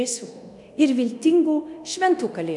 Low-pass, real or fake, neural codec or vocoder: 10.8 kHz; fake; codec, 24 kHz, 0.9 kbps, DualCodec